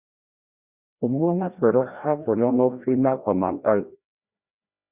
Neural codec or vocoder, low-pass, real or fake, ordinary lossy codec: codec, 16 kHz, 1 kbps, FreqCodec, larger model; 3.6 kHz; fake; Opus, 64 kbps